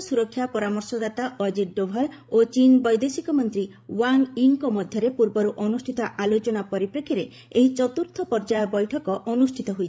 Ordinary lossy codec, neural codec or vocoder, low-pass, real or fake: none; codec, 16 kHz, 16 kbps, FreqCodec, larger model; none; fake